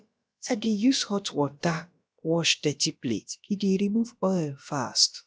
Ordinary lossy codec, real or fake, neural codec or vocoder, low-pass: none; fake; codec, 16 kHz, about 1 kbps, DyCAST, with the encoder's durations; none